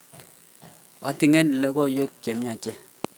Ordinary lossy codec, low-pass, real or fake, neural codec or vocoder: none; none; fake; codec, 44.1 kHz, 2.6 kbps, SNAC